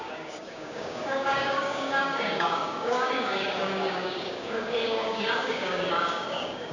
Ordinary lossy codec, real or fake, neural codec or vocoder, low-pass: none; fake; codec, 16 kHz, 6 kbps, DAC; 7.2 kHz